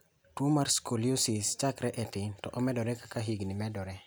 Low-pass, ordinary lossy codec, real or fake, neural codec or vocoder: none; none; real; none